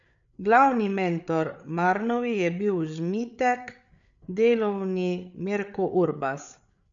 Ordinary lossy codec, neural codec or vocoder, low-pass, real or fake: none; codec, 16 kHz, 8 kbps, FreqCodec, larger model; 7.2 kHz; fake